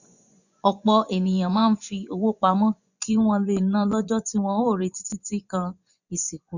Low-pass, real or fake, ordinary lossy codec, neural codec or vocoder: 7.2 kHz; fake; none; vocoder, 24 kHz, 100 mel bands, Vocos